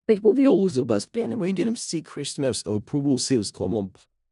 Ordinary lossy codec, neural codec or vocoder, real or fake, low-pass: none; codec, 16 kHz in and 24 kHz out, 0.4 kbps, LongCat-Audio-Codec, four codebook decoder; fake; 10.8 kHz